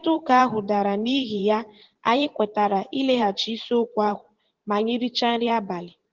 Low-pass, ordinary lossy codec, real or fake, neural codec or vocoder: 7.2 kHz; Opus, 16 kbps; fake; vocoder, 44.1 kHz, 128 mel bands every 512 samples, BigVGAN v2